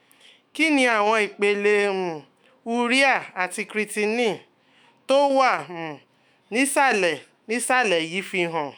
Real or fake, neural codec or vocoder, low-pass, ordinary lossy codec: fake; autoencoder, 48 kHz, 128 numbers a frame, DAC-VAE, trained on Japanese speech; none; none